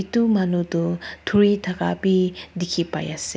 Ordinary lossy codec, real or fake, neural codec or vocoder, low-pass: none; real; none; none